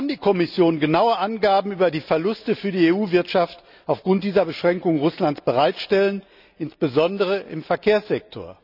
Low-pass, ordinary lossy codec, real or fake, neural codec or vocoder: 5.4 kHz; none; real; none